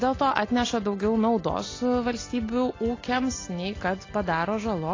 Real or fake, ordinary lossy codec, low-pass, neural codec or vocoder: real; AAC, 32 kbps; 7.2 kHz; none